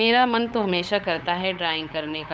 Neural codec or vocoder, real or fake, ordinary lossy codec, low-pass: codec, 16 kHz, 8 kbps, FunCodec, trained on LibriTTS, 25 frames a second; fake; none; none